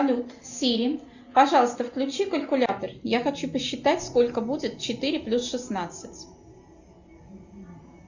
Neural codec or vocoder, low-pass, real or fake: none; 7.2 kHz; real